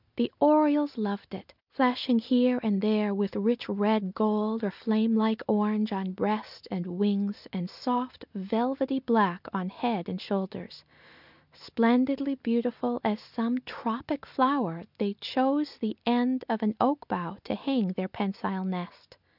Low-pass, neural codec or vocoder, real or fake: 5.4 kHz; none; real